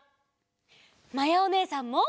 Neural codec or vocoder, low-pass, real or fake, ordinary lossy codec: none; none; real; none